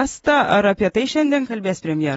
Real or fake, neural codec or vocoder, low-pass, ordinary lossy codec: fake; vocoder, 24 kHz, 100 mel bands, Vocos; 10.8 kHz; AAC, 24 kbps